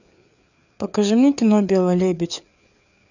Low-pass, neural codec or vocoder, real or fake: 7.2 kHz; codec, 16 kHz, 4 kbps, FreqCodec, larger model; fake